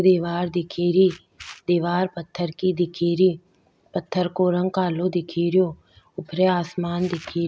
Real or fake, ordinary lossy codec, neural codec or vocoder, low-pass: real; none; none; none